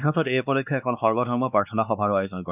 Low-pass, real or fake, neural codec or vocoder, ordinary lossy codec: 3.6 kHz; fake; codec, 16 kHz, 2 kbps, X-Codec, WavLM features, trained on Multilingual LibriSpeech; none